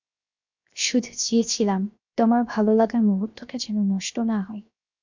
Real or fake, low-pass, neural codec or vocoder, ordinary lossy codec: fake; 7.2 kHz; codec, 16 kHz, 0.7 kbps, FocalCodec; MP3, 64 kbps